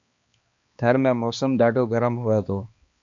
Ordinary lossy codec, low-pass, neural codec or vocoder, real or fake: AAC, 64 kbps; 7.2 kHz; codec, 16 kHz, 2 kbps, X-Codec, HuBERT features, trained on balanced general audio; fake